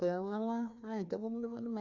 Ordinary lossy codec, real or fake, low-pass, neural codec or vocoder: MP3, 64 kbps; fake; 7.2 kHz; codec, 16 kHz, 2 kbps, FreqCodec, larger model